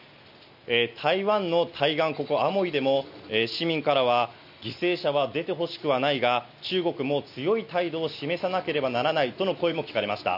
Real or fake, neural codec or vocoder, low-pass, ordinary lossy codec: real; none; 5.4 kHz; none